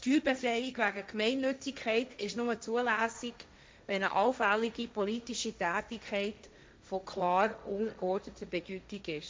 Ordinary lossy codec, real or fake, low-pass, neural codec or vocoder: none; fake; none; codec, 16 kHz, 1.1 kbps, Voila-Tokenizer